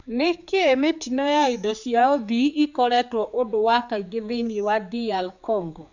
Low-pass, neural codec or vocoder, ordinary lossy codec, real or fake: 7.2 kHz; codec, 16 kHz, 4 kbps, X-Codec, HuBERT features, trained on general audio; none; fake